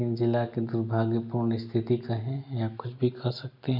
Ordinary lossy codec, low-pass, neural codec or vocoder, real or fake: none; 5.4 kHz; none; real